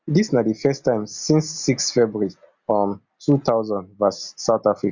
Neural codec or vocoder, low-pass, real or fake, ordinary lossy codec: none; 7.2 kHz; real; Opus, 64 kbps